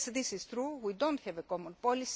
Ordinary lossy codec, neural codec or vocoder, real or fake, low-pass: none; none; real; none